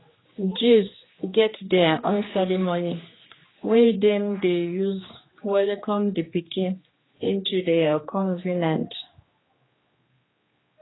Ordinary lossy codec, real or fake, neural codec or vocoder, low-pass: AAC, 16 kbps; fake; codec, 16 kHz, 2 kbps, X-Codec, HuBERT features, trained on general audio; 7.2 kHz